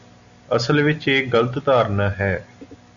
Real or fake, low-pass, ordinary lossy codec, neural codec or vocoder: real; 7.2 kHz; MP3, 96 kbps; none